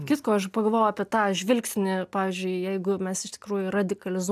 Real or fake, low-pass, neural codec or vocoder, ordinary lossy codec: real; 14.4 kHz; none; AAC, 96 kbps